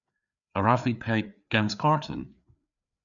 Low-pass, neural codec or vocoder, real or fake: 7.2 kHz; codec, 16 kHz, 4 kbps, FreqCodec, larger model; fake